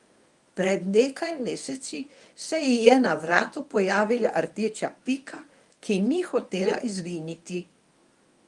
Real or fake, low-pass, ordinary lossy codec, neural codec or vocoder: fake; 10.8 kHz; Opus, 32 kbps; codec, 24 kHz, 0.9 kbps, WavTokenizer, medium speech release version 1